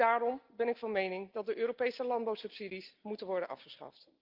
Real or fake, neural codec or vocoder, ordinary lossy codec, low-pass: real; none; Opus, 32 kbps; 5.4 kHz